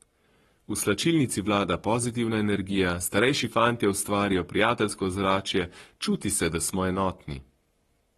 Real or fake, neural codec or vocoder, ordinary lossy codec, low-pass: fake; codec, 44.1 kHz, 7.8 kbps, Pupu-Codec; AAC, 32 kbps; 19.8 kHz